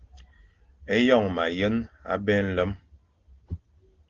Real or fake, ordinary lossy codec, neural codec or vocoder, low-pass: real; Opus, 16 kbps; none; 7.2 kHz